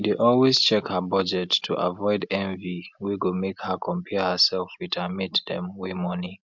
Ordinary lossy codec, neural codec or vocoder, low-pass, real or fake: MP3, 64 kbps; none; 7.2 kHz; real